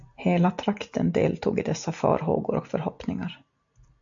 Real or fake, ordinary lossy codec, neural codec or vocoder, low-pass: real; MP3, 64 kbps; none; 7.2 kHz